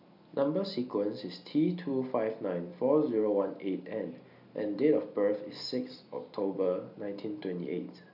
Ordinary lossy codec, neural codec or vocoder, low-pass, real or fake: none; none; 5.4 kHz; real